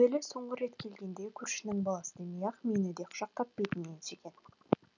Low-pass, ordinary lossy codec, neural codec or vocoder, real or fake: 7.2 kHz; none; none; real